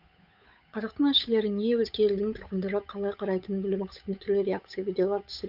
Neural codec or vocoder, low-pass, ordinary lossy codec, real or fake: codec, 16 kHz, 8 kbps, FunCodec, trained on Chinese and English, 25 frames a second; 5.4 kHz; none; fake